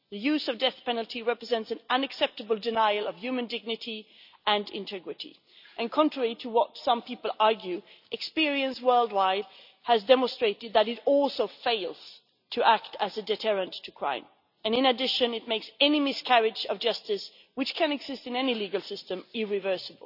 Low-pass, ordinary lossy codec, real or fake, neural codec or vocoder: 5.4 kHz; none; real; none